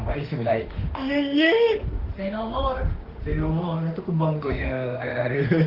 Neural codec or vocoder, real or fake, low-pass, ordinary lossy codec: autoencoder, 48 kHz, 32 numbers a frame, DAC-VAE, trained on Japanese speech; fake; 5.4 kHz; Opus, 16 kbps